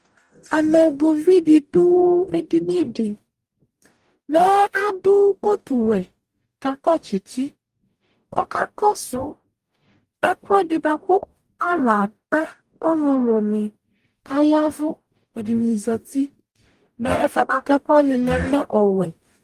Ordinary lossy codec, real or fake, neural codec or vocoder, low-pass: Opus, 32 kbps; fake; codec, 44.1 kHz, 0.9 kbps, DAC; 14.4 kHz